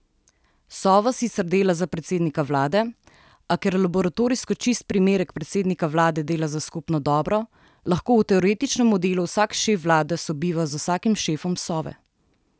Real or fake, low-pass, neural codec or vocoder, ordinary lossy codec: real; none; none; none